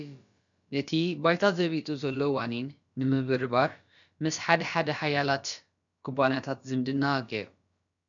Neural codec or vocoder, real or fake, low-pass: codec, 16 kHz, about 1 kbps, DyCAST, with the encoder's durations; fake; 7.2 kHz